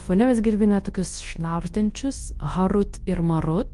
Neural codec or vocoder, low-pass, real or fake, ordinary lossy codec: codec, 24 kHz, 0.9 kbps, WavTokenizer, large speech release; 10.8 kHz; fake; Opus, 24 kbps